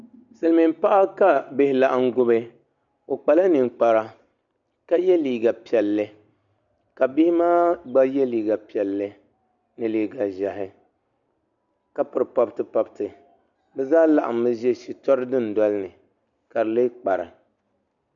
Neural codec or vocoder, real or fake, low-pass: none; real; 7.2 kHz